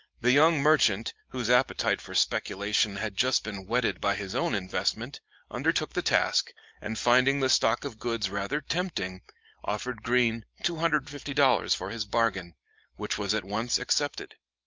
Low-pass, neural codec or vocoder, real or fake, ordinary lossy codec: 7.2 kHz; vocoder, 44.1 kHz, 128 mel bands every 512 samples, BigVGAN v2; fake; Opus, 24 kbps